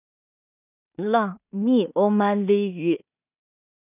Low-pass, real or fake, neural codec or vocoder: 3.6 kHz; fake; codec, 16 kHz in and 24 kHz out, 0.4 kbps, LongCat-Audio-Codec, two codebook decoder